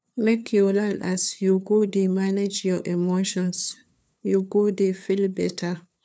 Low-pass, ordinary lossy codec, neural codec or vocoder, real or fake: none; none; codec, 16 kHz, 2 kbps, FunCodec, trained on LibriTTS, 25 frames a second; fake